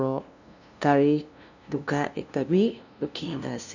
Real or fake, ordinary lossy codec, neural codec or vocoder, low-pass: fake; none; codec, 16 kHz, 0.5 kbps, FunCodec, trained on LibriTTS, 25 frames a second; 7.2 kHz